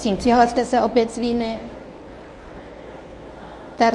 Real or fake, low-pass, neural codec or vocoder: fake; 10.8 kHz; codec, 24 kHz, 0.9 kbps, WavTokenizer, medium speech release version 1